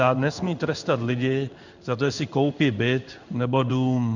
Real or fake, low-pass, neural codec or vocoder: fake; 7.2 kHz; codec, 16 kHz in and 24 kHz out, 1 kbps, XY-Tokenizer